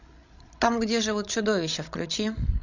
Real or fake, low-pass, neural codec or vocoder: fake; 7.2 kHz; codec, 16 kHz, 16 kbps, FreqCodec, larger model